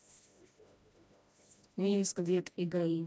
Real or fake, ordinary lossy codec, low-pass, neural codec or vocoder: fake; none; none; codec, 16 kHz, 1 kbps, FreqCodec, smaller model